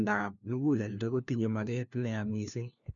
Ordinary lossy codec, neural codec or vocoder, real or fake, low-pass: none; codec, 16 kHz, 1 kbps, FunCodec, trained on LibriTTS, 50 frames a second; fake; 7.2 kHz